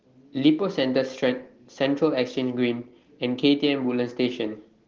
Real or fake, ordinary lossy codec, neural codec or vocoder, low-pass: real; Opus, 16 kbps; none; 7.2 kHz